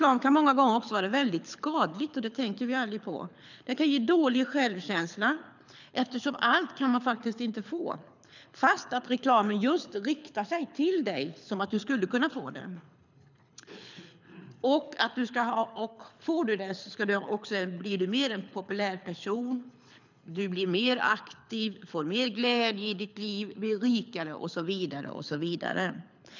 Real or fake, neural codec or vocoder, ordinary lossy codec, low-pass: fake; codec, 24 kHz, 6 kbps, HILCodec; none; 7.2 kHz